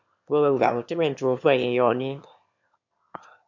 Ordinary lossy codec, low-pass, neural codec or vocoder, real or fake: MP3, 48 kbps; 7.2 kHz; autoencoder, 22.05 kHz, a latent of 192 numbers a frame, VITS, trained on one speaker; fake